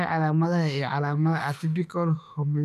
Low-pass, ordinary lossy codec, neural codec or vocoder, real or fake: 14.4 kHz; none; autoencoder, 48 kHz, 32 numbers a frame, DAC-VAE, trained on Japanese speech; fake